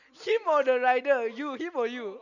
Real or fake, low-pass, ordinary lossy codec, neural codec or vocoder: real; 7.2 kHz; none; none